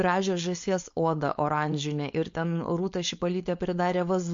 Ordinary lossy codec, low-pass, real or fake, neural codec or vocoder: MP3, 48 kbps; 7.2 kHz; fake; codec, 16 kHz, 4.8 kbps, FACodec